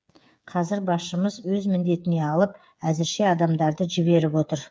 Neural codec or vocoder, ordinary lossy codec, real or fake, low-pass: codec, 16 kHz, 8 kbps, FreqCodec, smaller model; none; fake; none